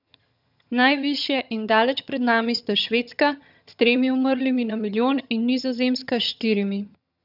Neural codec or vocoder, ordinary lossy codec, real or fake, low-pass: vocoder, 22.05 kHz, 80 mel bands, HiFi-GAN; none; fake; 5.4 kHz